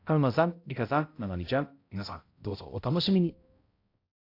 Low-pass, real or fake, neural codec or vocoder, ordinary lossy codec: 5.4 kHz; fake; codec, 16 kHz, 0.5 kbps, X-Codec, HuBERT features, trained on balanced general audio; AAC, 32 kbps